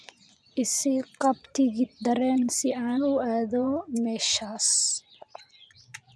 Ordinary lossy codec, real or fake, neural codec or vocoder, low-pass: none; fake; vocoder, 24 kHz, 100 mel bands, Vocos; none